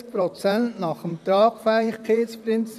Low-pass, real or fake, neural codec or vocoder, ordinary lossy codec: 14.4 kHz; fake; vocoder, 44.1 kHz, 128 mel bands, Pupu-Vocoder; none